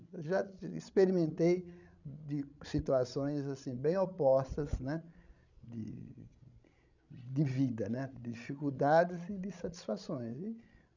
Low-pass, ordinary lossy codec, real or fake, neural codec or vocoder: 7.2 kHz; none; fake; codec, 16 kHz, 16 kbps, FreqCodec, larger model